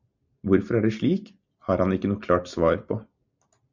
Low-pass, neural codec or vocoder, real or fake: 7.2 kHz; none; real